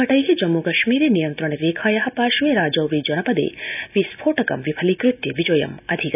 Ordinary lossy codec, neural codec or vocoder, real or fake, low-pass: none; none; real; 3.6 kHz